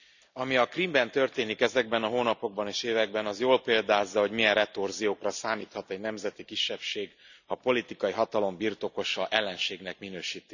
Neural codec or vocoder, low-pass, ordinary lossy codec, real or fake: none; 7.2 kHz; none; real